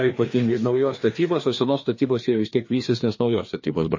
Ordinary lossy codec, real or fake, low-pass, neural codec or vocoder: MP3, 32 kbps; fake; 7.2 kHz; codec, 16 kHz, 2 kbps, FreqCodec, larger model